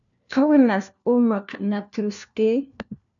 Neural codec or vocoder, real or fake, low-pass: codec, 16 kHz, 1 kbps, FunCodec, trained on LibriTTS, 50 frames a second; fake; 7.2 kHz